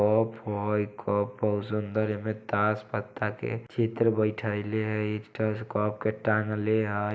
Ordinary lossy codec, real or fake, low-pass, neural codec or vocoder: none; real; none; none